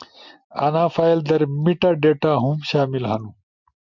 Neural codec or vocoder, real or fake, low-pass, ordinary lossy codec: none; real; 7.2 kHz; MP3, 64 kbps